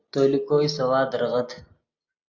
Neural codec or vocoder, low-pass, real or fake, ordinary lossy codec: none; 7.2 kHz; real; AAC, 48 kbps